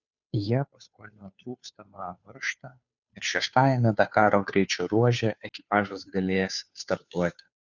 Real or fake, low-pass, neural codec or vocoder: fake; 7.2 kHz; codec, 16 kHz, 2 kbps, FunCodec, trained on Chinese and English, 25 frames a second